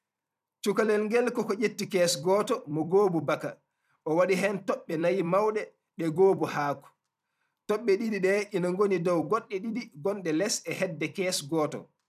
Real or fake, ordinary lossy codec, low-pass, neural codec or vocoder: fake; none; 14.4 kHz; vocoder, 44.1 kHz, 128 mel bands every 256 samples, BigVGAN v2